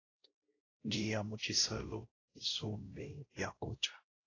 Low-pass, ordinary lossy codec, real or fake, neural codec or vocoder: 7.2 kHz; AAC, 32 kbps; fake; codec, 16 kHz, 0.5 kbps, X-Codec, WavLM features, trained on Multilingual LibriSpeech